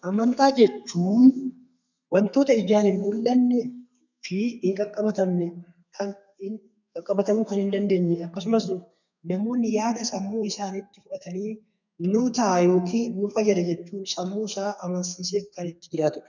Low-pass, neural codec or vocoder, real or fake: 7.2 kHz; codec, 32 kHz, 1.9 kbps, SNAC; fake